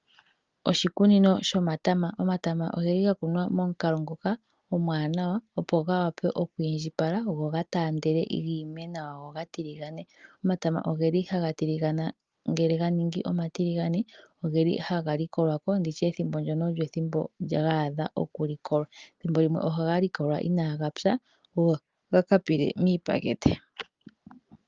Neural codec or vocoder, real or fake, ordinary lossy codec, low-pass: none; real; Opus, 32 kbps; 7.2 kHz